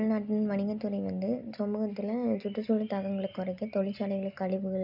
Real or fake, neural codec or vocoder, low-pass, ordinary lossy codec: real; none; 5.4 kHz; none